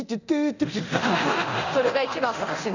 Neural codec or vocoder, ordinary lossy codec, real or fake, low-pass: codec, 24 kHz, 0.9 kbps, DualCodec; none; fake; 7.2 kHz